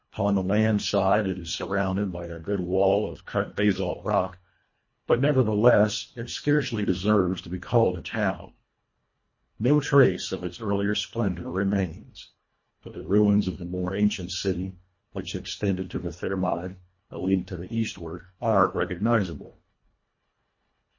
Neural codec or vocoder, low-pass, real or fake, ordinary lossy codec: codec, 24 kHz, 1.5 kbps, HILCodec; 7.2 kHz; fake; MP3, 32 kbps